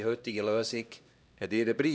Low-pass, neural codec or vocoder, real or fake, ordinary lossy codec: none; codec, 16 kHz, 1 kbps, X-Codec, HuBERT features, trained on LibriSpeech; fake; none